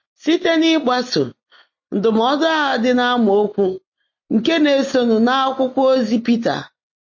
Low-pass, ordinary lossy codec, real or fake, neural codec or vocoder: 7.2 kHz; MP3, 32 kbps; real; none